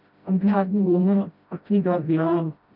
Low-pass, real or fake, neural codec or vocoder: 5.4 kHz; fake; codec, 16 kHz, 0.5 kbps, FreqCodec, smaller model